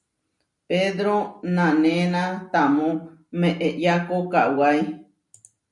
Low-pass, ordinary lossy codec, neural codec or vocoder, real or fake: 10.8 kHz; MP3, 96 kbps; none; real